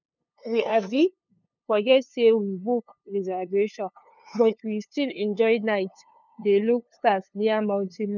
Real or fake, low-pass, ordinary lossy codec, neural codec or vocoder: fake; 7.2 kHz; none; codec, 16 kHz, 2 kbps, FunCodec, trained on LibriTTS, 25 frames a second